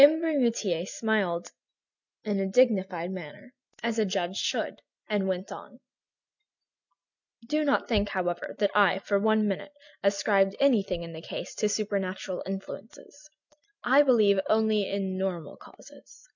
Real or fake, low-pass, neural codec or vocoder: real; 7.2 kHz; none